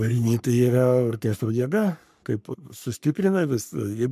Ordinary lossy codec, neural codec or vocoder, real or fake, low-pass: MP3, 96 kbps; codec, 44.1 kHz, 3.4 kbps, Pupu-Codec; fake; 14.4 kHz